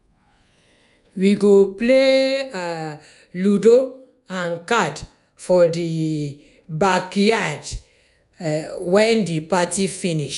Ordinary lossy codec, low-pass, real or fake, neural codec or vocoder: none; 10.8 kHz; fake; codec, 24 kHz, 1.2 kbps, DualCodec